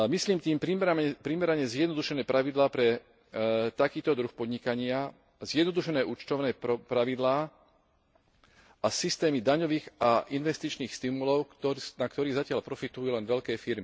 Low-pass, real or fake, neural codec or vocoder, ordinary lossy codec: none; real; none; none